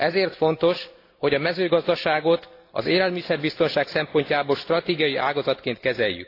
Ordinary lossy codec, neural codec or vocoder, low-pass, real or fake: AAC, 32 kbps; none; 5.4 kHz; real